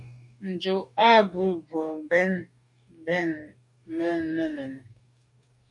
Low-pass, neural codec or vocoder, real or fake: 10.8 kHz; codec, 44.1 kHz, 2.6 kbps, DAC; fake